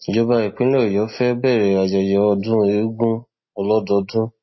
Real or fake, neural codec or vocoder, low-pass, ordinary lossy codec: real; none; 7.2 kHz; MP3, 24 kbps